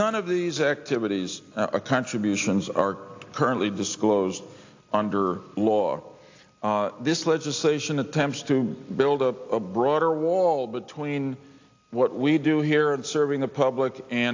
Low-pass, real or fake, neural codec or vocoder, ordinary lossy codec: 7.2 kHz; real; none; AAC, 48 kbps